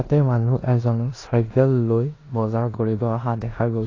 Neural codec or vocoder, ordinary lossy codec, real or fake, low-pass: codec, 16 kHz in and 24 kHz out, 0.9 kbps, LongCat-Audio-Codec, four codebook decoder; AAC, 32 kbps; fake; 7.2 kHz